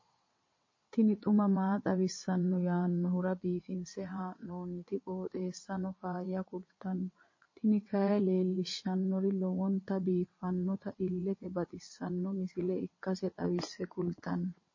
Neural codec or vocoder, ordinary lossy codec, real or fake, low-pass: vocoder, 22.05 kHz, 80 mel bands, WaveNeXt; MP3, 32 kbps; fake; 7.2 kHz